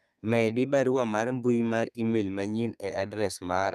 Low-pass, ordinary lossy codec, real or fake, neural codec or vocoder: 14.4 kHz; none; fake; codec, 32 kHz, 1.9 kbps, SNAC